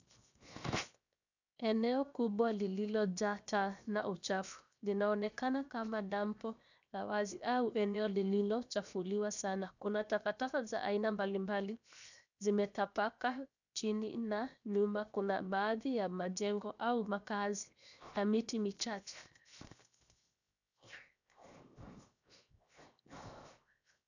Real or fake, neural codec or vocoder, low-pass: fake; codec, 16 kHz, 0.7 kbps, FocalCodec; 7.2 kHz